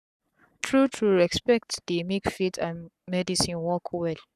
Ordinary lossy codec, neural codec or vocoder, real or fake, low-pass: none; codec, 44.1 kHz, 7.8 kbps, Pupu-Codec; fake; 14.4 kHz